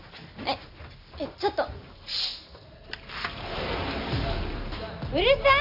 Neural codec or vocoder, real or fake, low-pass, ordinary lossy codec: none; real; 5.4 kHz; none